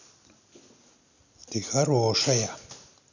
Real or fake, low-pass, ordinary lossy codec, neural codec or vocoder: real; 7.2 kHz; none; none